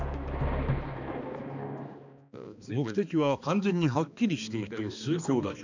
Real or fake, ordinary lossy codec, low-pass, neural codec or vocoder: fake; none; 7.2 kHz; codec, 16 kHz, 2 kbps, X-Codec, HuBERT features, trained on balanced general audio